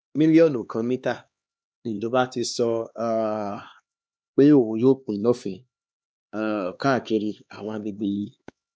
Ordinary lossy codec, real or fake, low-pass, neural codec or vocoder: none; fake; none; codec, 16 kHz, 2 kbps, X-Codec, HuBERT features, trained on LibriSpeech